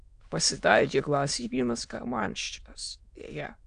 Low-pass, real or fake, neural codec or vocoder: 9.9 kHz; fake; autoencoder, 22.05 kHz, a latent of 192 numbers a frame, VITS, trained on many speakers